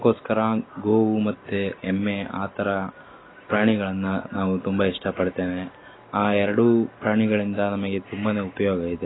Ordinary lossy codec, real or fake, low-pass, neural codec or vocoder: AAC, 16 kbps; real; 7.2 kHz; none